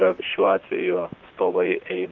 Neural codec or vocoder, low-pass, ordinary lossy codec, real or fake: vocoder, 44.1 kHz, 128 mel bands, Pupu-Vocoder; 7.2 kHz; Opus, 24 kbps; fake